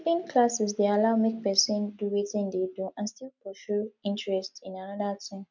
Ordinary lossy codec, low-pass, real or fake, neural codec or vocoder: none; 7.2 kHz; real; none